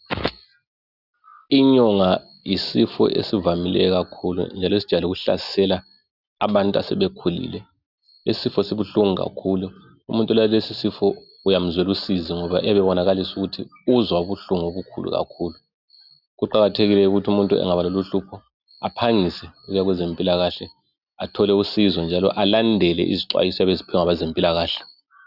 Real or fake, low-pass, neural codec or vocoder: real; 5.4 kHz; none